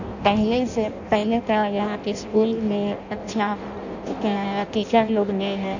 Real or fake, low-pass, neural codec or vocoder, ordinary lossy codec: fake; 7.2 kHz; codec, 16 kHz in and 24 kHz out, 0.6 kbps, FireRedTTS-2 codec; none